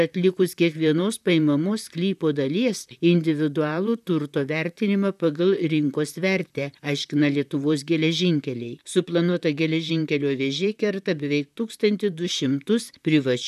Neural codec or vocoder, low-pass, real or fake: vocoder, 44.1 kHz, 128 mel bands, Pupu-Vocoder; 14.4 kHz; fake